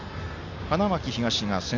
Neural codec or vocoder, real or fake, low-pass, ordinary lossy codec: none; real; 7.2 kHz; MP3, 48 kbps